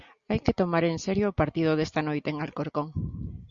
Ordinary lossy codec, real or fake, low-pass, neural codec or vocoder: Opus, 64 kbps; real; 7.2 kHz; none